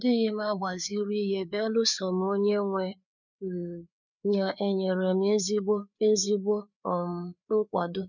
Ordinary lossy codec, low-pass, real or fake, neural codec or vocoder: none; 7.2 kHz; fake; codec, 16 kHz, 4 kbps, FreqCodec, larger model